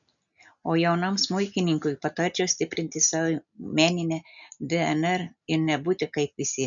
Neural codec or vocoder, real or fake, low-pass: none; real; 7.2 kHz